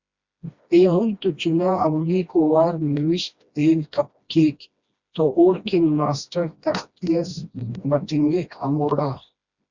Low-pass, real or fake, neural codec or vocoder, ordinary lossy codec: 7.2 kHz; fake; codec, 16 kHz, 1 kbps, FreqCodec, smaller model; Opus, 64 kbps